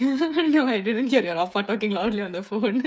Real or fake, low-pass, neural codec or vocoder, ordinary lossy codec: fake; none; codec, 16 kHz, 8 kbps, FreqCodec, smaller model; none